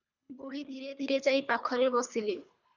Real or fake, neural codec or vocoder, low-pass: fake; codec, 24 kHz, 3 kbps, HILCodec; 7.2 kHz